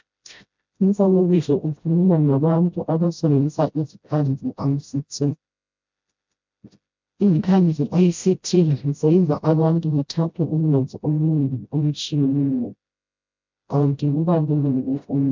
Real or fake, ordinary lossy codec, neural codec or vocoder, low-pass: fake; MP3, 64 kbps; codec, 16 kHz, 0.5 kbps, FreqCodec, smaller model; 7.2 kHz